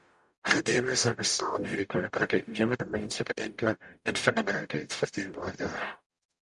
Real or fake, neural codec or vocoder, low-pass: fake; codec, 44.1 kHz, 0.9 kbps, DAC; 10.8 kHz